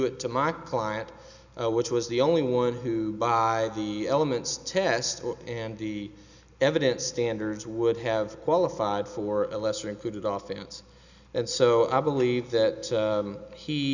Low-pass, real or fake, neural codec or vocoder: 7.2 kHz; real; none